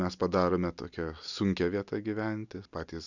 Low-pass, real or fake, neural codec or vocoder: 7.2 kHz; real; none